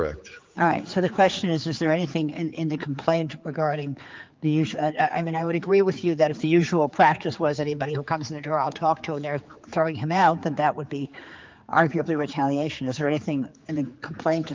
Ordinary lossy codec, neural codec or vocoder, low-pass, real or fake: Opus, 24 kbps; codec, 16 kHz, 4 kbps, X-Codec, HuBERT features, trained on general audio; 7.2 kHz; fake